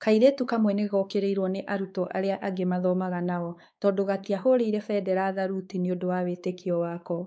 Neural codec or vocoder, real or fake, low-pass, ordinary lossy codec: codec, 16 kHz, 4 kbps, X-Codec, WavLM features, trained on Multilingual LibriSpeech; fake; none; none